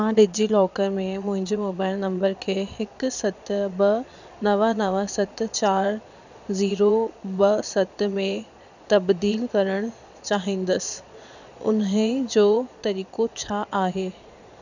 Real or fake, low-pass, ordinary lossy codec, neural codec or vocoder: fake; 7.2 kHz; none; vocoder, 22.05 kHz, 80 mel bands, Vocos